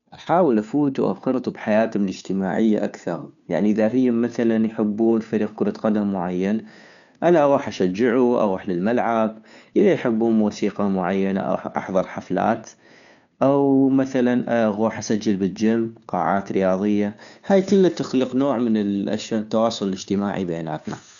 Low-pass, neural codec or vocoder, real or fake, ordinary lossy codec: 7.2 kHz; codec, 16 kHz, 2 kbps, FunCodec, trained on Chinese and English, 25 frames a second; fake; none